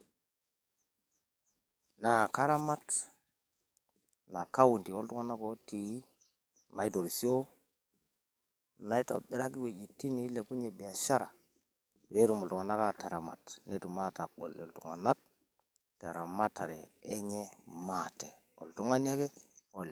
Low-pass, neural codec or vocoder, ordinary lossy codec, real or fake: none; codec, 44.1 kHz, 7.8 kbps, DAC; none; fake